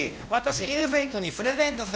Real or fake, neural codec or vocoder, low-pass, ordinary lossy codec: fake; codec, 16 kHz, 1 kbps, X-Codec, WavLM features, trained on Multilingual LibriSpeech; none; none